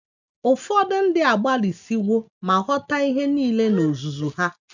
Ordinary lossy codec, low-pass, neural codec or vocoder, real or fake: none; 7.2 kHz; none; real